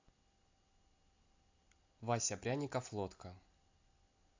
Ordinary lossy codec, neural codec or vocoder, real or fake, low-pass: AAC, 48 kbps; none; real; 7.2 kHz